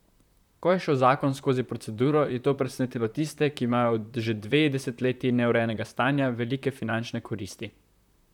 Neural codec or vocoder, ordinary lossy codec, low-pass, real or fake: vocoder, 44.1 kHz, 128 mel bands, Pupu-Vocoder; none; 19.8 kHz; fake